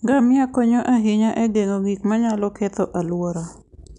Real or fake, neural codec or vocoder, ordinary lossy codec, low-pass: real; none; none; 10.8 kHz